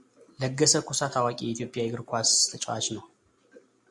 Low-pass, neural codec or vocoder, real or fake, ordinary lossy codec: 10.8 kHz; none; real; Opus, 64 kbps